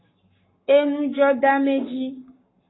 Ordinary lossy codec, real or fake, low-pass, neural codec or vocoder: AAC, 16 kbps; fake; 7.2 kHz; codec, 44.1 kHz, 7.8 kbps, Pupu-Codec